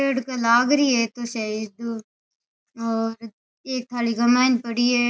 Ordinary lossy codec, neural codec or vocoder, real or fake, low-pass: none; none; real; none